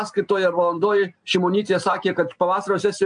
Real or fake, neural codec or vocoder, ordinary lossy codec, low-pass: real; none; MP3, 64 kbps; 9.9 kHz